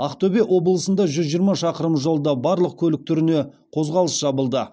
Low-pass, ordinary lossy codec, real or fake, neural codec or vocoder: none; none; real; none